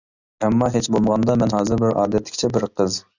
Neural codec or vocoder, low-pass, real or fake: none; 7.2 kHz; real